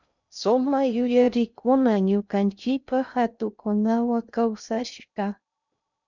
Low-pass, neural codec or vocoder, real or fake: 7.2 kHz; codec, 16 kHz in and 24 kHz out, 0.6 kbps, FocalCodec, streaming, 2048 codes; fake